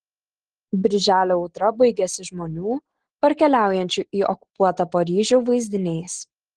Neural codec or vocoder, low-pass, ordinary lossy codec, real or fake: none; 10.8 kHz; Opus, 16 kbps; real